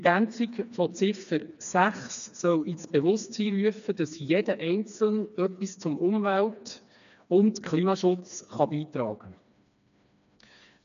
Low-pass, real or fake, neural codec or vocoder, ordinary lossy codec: 7.2 kHz; fake; codec, 16 kHz, 2 kbps, FreqCodec, smaller model; none